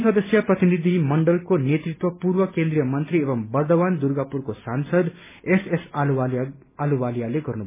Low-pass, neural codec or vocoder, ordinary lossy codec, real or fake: 3.6 kHz; none; MP3, 16 kbps; real